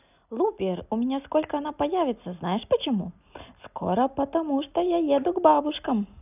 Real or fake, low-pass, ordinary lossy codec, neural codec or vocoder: real; 3.6 kHz; none; none